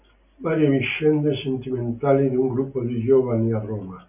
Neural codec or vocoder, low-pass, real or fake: none; 3.6 kHz; real